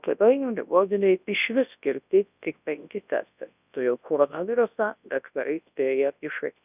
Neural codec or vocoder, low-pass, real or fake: codec, 24 kHz, 0.9 kbps, WavTokenizer, large speech release; 3.6 kHz; fake